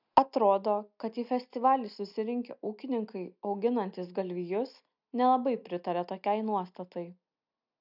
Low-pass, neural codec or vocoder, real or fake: 5.4 kHz; none; real